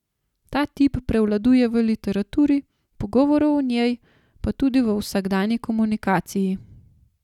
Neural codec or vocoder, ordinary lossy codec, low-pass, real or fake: none; none; 19.8 kHz; real